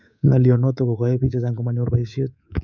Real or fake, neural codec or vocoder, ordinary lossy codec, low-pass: fake; codec, 24 kHz, 3.1 kbps, DualCodec; none; 7.2 kHz